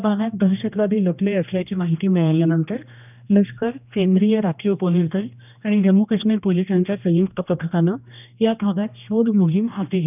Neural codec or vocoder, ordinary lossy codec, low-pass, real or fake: codec, 16 kHz, 1 kbps, X-Codec, HuBERT features, trained on general audio; none; 3.6 kHz; fake